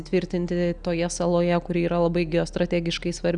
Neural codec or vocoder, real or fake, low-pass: none; real; 9.9 kHz